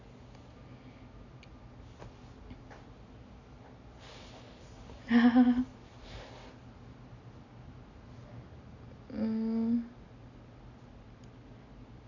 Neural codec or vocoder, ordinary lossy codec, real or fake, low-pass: none; none; real; 7.2 kHz